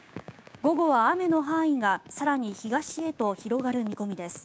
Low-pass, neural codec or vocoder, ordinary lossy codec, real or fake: none; codec, 16 kHz, 6 kbps, DAC; none; fake